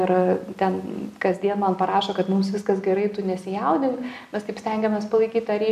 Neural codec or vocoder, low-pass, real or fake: none; 14.4 kHz; real